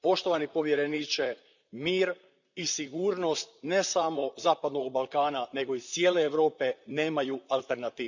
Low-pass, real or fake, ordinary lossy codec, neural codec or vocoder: 7.2 kHz; fake; none; vocoder, 44.1 kHz, 128 mel bands, Pupu-Vocoder